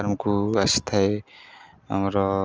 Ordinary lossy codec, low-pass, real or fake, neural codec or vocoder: Opus, 24 kbps; 7.2 kHz; real; none